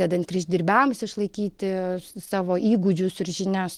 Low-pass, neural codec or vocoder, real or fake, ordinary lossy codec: 19.8 kHz; none; real; Opus, 16 kbps